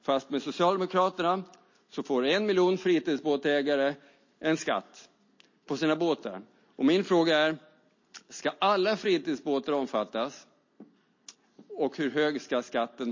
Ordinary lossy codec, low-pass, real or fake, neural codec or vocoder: MP3, 32 kbps; 7.2 kHz; real; none